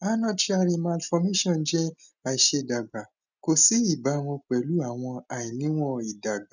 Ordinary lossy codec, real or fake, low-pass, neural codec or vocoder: none; real; 7.2 kHz; none